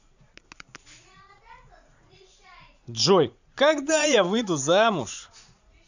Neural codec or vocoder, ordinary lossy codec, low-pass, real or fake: vocoder, 44.1 kHz, 80 mel bands, Vocos; none; 7.2 kHz; fake